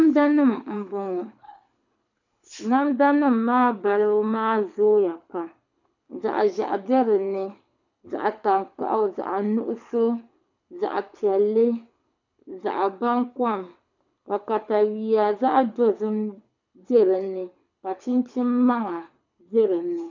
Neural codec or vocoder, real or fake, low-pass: codec, 24 kHz, 6 kbps, HILCodec; fake; 7.2 kHz